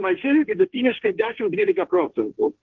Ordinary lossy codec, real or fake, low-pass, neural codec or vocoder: Opus, 16 kbps; fake; 7.2 kHz; codec, 16 kHz, 1.1 kbps, Voila-Tokenizer